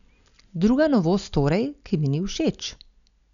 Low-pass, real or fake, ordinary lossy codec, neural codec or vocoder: 7.2 kHz; real; none; none